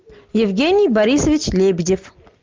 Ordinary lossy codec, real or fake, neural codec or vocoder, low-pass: Opus, 32 kbps; real; none; 7.2 kHz